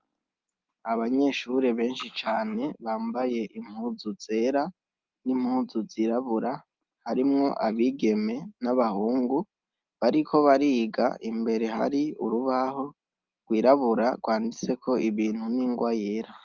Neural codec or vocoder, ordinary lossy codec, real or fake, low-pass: none; Opus, 24 kbps; real; 7.2 kHz